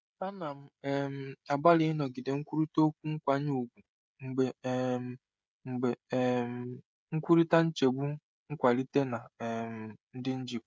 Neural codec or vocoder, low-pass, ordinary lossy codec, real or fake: codec, 16 kHz, 16 kbps, FreqCodec, smaller model; none; none; fake